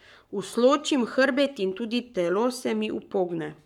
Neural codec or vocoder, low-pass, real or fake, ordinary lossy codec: codec, 44.1 kHz, 7.8 kbps, Pupu-Codec; 19.8 kHz; fake; none